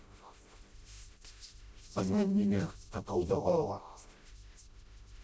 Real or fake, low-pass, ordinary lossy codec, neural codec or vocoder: fake; none; none; codec, 16 kHz, 0.5 kbps, FreqCodec, smaller model